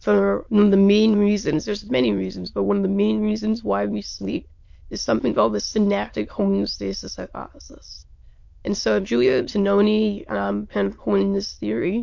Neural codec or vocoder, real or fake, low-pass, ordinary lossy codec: autoencoder, 22.05 kHz, a latent of 192 numbers a frame, VITS, trained on many speakers; fake; 7.2 kHz; MP3, 48 kbps